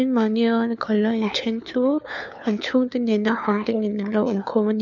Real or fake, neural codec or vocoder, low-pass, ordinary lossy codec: fake; codec, 16 kHz, 4 kbps, FunCodec, trained on LibriTTS, 50 frames a second; 7.2 kHz; none